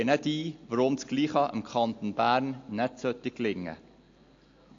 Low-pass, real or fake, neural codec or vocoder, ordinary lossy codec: 7.2 kHz; real; none; AAC, 48 kbps